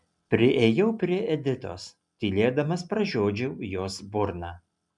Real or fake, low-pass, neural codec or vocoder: real; 10.8 kHz; none